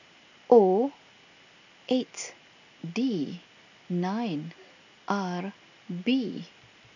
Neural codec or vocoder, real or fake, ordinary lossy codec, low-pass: none; real; none; 7.2 kHz